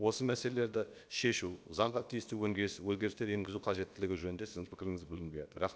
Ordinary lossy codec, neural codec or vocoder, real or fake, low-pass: none; codec, 16 kHz, about 1 kbps, DyCAST, with the encoder's durations; fake; none